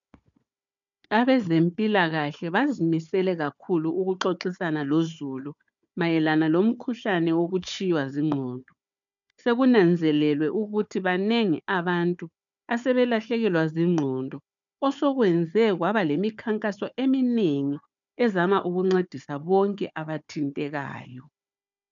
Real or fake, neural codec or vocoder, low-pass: fake; codec, 16 kHz, 4 kbps, FunCodec, trained on Chinese and English, 50 frames a second; 7.2 kHz